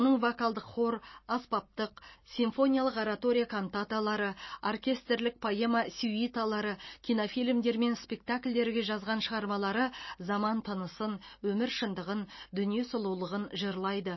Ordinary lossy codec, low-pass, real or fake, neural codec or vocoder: MP3, 24 kbps; 7.2 kHz; real; none